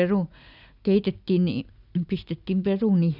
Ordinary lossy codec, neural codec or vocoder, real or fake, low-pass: none; none; real; 5.4 kHz